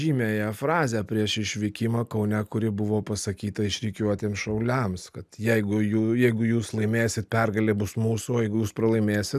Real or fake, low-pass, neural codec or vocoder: real; 14.4 kHz; none